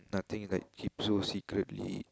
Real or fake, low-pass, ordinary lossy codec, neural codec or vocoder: real; none; none; none